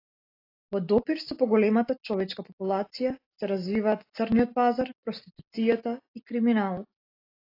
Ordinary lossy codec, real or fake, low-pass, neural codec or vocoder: AAC, 32 kbps; real; 5.4 kHz; none